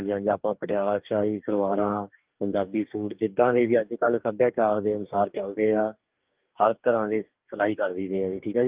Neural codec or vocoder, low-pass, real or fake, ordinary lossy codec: codec, 44.1 kHz, 2.6 kbps, DAC; 3.6 kHz; fake; Opus, 32 kbps